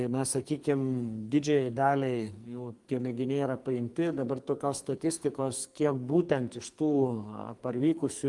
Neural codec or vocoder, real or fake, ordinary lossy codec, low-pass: codec, 44.1 kHz, 2.6 kbps, SNAC; fake; Opus, 32 kbps; 10.8 kHz